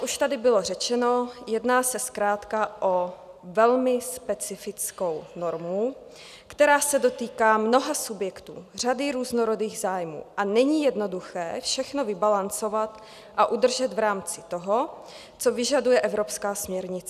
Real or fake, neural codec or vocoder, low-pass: real; none; 14.4 kHz